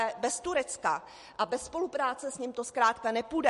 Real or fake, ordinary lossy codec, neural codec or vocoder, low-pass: real; MP3, 48 kbps; none; 14.4 kHz